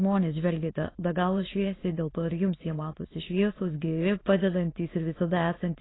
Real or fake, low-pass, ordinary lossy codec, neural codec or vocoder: fake; 7.2 kHz; AAC, 16 kbps; autoencoder, 22.05 kHz, a latent of 192 numbers a frame, VITS, trained on many speakers